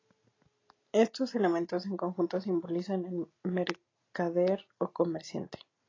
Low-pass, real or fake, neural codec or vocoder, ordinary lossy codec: 7.2 kHz; real; none; AAC, 32 kbps